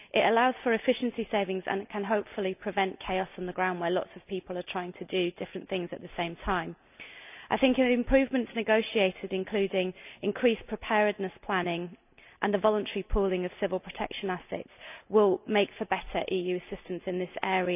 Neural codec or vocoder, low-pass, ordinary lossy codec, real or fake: none; 3.6 kHz; none; real